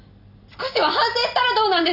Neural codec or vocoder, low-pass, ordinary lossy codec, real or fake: none; 5.4 kHz; none; real